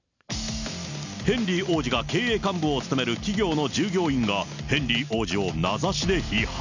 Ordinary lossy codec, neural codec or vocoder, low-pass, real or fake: none; none; 7.2 kHz; real